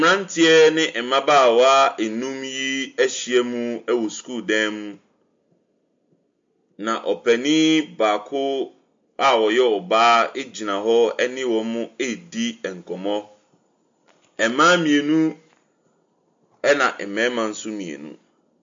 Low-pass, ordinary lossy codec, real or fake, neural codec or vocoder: 7.2 kHz; MP3, 48 kbps; real; none